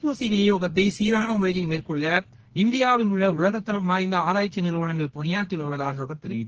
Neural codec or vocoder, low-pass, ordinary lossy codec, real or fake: codec, 24 kHz, 0.9 kbps, WavTokenizer, medium music audio release; 7.2 kHz; Opus, 16 kbps; fake